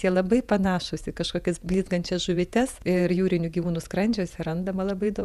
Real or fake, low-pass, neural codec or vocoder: fake; 14.4 kHz; vocoder, 48 kHz, 128 mel bands, Vocos